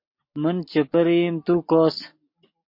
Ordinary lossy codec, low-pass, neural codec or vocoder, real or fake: MP3, 32 kbps; 5.4 kHz; none; real